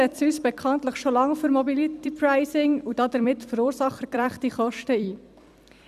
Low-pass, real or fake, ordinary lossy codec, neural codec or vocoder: 14.4 kHz; fake; none; vocoder, 44.1 kHz, 128 mel bands every 256 samples, BigVGAN v2